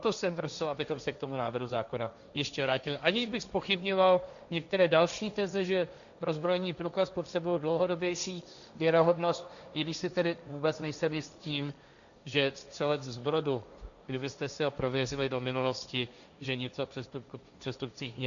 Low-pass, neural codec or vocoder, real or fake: 7.2 kHz; codec, 16 kHz, 1.1 kbps, Voila-Tokenizer; fake